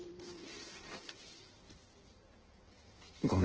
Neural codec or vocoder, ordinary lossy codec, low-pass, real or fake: codec, 16 kHz in and 24 kHz out, 1.1 kbps, FireRedTTS-2 codec; Opus, 16 kbps; 7.2 kHz; fake